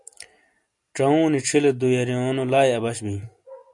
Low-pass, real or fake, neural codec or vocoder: 10.8 kHz; real; none